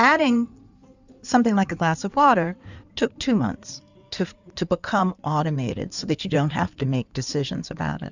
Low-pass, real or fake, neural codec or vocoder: 7.2 kHz; fake; codec, 16 kHz in and 24 kHz out, 2.2 kbps, FireRedTTS-2 codec